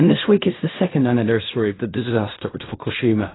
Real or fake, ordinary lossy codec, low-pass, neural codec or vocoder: fake; AAC, 16 kbps; 7.2 kHz; codec, 16 kHz in and 24 kHz out, 0.4 kbps, LongCat-Audio-Codec, fine tuned four codebook decoder